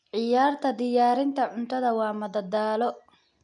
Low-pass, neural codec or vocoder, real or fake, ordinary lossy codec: 9.9 kHz; none; real; none